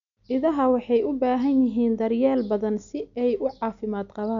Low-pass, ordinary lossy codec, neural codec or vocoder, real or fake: 7.2 kHz; none; none; real